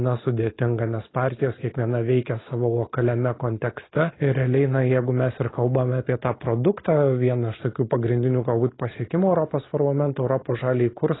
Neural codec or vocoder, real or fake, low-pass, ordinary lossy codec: none; real; 7.2 kHz; AAC, 16 kbps